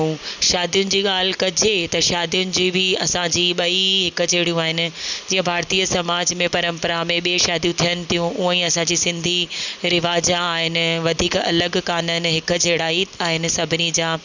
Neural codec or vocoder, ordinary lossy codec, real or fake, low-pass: none; none; real; 7.2 kHz